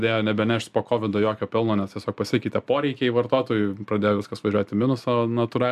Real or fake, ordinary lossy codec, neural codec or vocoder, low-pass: real; MP3, 96 kbps; none; 14.4 kHz